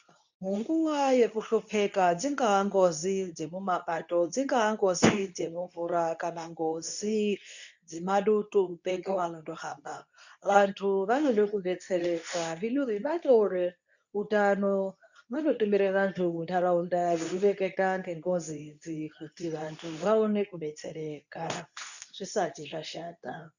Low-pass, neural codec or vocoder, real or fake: 7.2 kHz; codec, 24 kHz, 0.9 kbps, WavTokenizer, medium speech release version 2; fake